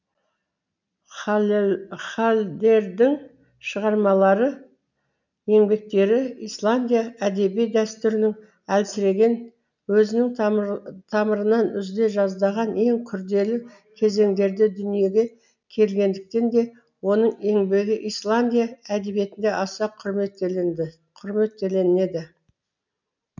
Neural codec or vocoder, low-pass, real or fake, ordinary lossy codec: none; 7.2 kHz; real; none